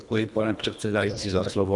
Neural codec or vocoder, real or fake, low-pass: codec, 24 kHz, 1.5 kbps, HILCodec; fake; 10.8 kHz